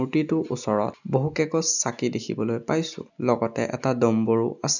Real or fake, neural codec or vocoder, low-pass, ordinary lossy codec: real; none; 7.2 kHz; none